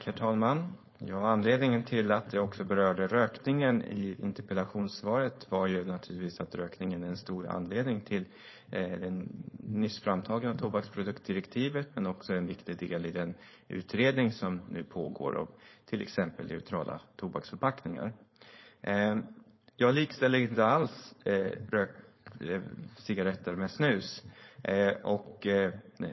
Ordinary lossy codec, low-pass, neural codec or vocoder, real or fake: MP3, 24 kbps; 7.2 kHz; codec, 16 kHz, 4.8 kbps, FACodec; fake